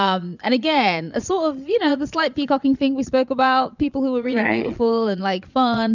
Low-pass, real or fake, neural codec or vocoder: 7.2 kHz; fake; vocoder, 22.05 kHz, 80 mel bands, Vocos